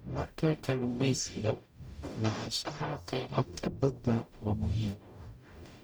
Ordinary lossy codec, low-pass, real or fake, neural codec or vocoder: none; none; fake; codec, 44.1 kHz, 0.9 kbps, DAC